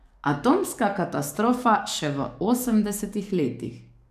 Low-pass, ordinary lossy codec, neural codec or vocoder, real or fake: 14.4 kHz; none; codec, 44.1 kHz, 7.8 kbps, DAC; fake